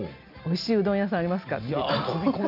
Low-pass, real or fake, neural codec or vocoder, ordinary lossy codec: 5.4 kHz; real; none; none